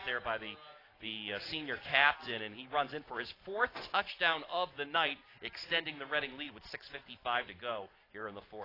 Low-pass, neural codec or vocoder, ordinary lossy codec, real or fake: 5.4 kHz; none; AAC, 24 kbps; real